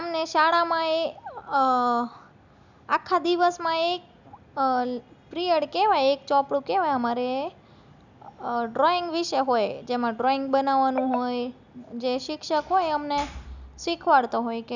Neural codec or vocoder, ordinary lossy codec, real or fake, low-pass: none; none; real; 7.2 kHz